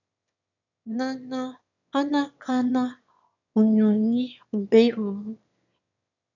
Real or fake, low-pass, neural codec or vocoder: fake; 7.2 kHz; autoencoder, 22.05 kHz, a latent of 192 numbers a frame, VITS, trained on one speaker